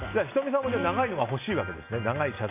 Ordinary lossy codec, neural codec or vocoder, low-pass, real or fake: none; none; 3.6 kHz; real